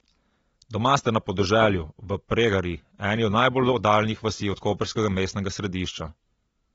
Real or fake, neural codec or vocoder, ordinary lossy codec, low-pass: real; none; AAC, 24 kbps; 19.8 kHz